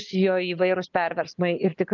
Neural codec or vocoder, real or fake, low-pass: none; real; 7.2 kHz